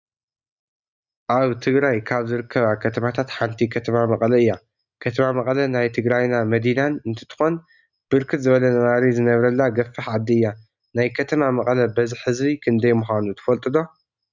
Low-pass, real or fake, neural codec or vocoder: 7.2 kHz; real; none